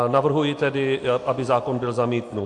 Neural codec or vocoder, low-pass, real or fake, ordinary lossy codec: none; 10.8 kHz; real; AAC, 48 kbps